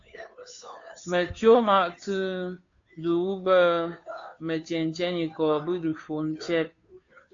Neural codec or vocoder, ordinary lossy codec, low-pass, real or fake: codec, 16 kHz, 2 kbps, FunCodec, trained on Chinese and English, 25 frames a second; AAC, 48 kbps; 7.2 kHz; fake